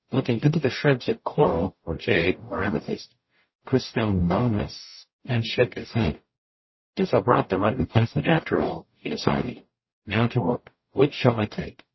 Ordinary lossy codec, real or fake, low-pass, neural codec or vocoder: MP3, 24 kbps; fake; 7.2 kHz; codec, 44.1 kHz, 0.9 kbps, DAC